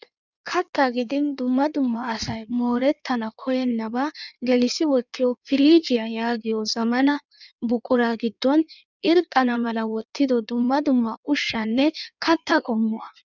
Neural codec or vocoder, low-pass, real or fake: codec, 16 kHz in and 24 kHz out, 1.1 kbps, FireRedTTS-2 codec; 7.2 kHz; fake